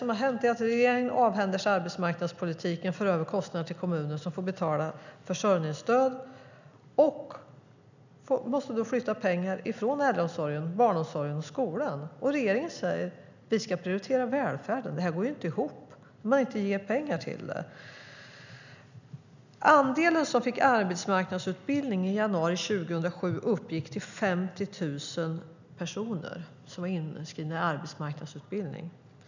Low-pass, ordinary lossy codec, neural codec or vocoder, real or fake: 7.2 kHz; none; none; real